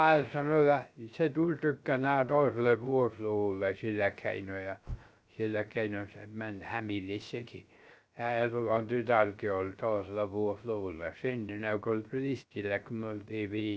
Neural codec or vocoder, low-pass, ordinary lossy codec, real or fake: codec, 16 kHz, 0.3 kbps, FocalCodec; none; none; fake